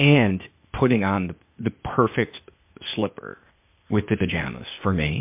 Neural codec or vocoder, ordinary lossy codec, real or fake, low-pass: codec, 16 kHz, 0.8 kbps, ZipCodec; MP3, 32 kbps; fake; 3.6 kHz